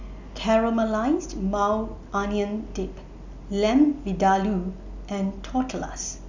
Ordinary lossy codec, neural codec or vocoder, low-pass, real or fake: none; none; 7.2 kHz; real